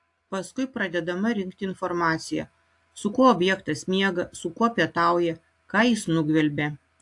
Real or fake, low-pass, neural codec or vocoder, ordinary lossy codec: real; 10.8 kHz; none; AAC, 64 kbps